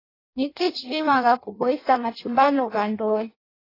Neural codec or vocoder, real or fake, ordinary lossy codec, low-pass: codec, 16 kHz in and 24 kHz out, 0.6 kbps, FireRedTTS-2 codec; fake; AAC, 24 kbps; 5.4 kHz